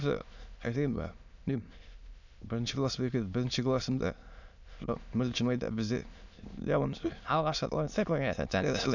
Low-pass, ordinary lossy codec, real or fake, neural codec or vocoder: 7.2 kHz; none; fake; autoencoder, 22.05 kHz, a latent of 192 numbers a frame, VITS, trained on many speakers